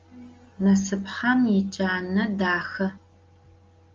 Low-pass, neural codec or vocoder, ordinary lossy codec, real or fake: 7.2 kHz; none; Opus, 32 kbps; real